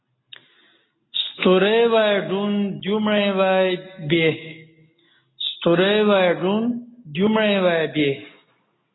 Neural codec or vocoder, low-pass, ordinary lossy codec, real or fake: none; 7.2 kHz; AAC, 16 kbps; real